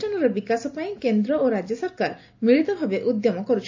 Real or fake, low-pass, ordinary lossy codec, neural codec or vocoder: real; 7.2 kHz; MP3, 48 kbps; none